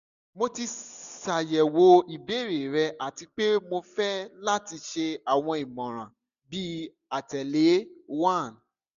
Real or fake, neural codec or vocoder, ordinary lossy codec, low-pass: real; none; none; 7.2 kHz